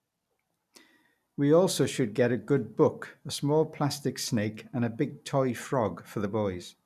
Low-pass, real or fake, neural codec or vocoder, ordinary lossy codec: 14.4 kHz; real; none; none